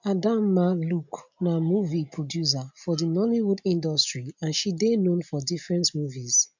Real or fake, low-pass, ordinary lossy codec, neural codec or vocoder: real; 7.2 kHz; none; none